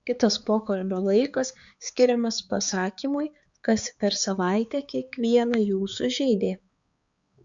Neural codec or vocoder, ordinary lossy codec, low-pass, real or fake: codec, 16 kHz, 4 kbps, X-Codec, HuBERT features, trained on balanced general audio; Opus, 64 kbps; 7.2 kHz; fake